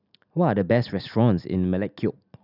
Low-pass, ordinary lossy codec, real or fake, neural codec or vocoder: 5.4 kHz; none; real; none